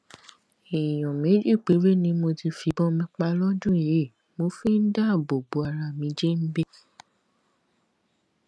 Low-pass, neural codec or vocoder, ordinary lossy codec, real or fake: none; none; none; real